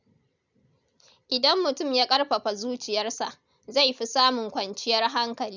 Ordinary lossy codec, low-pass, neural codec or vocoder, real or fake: none; 7.2 kHz; none; real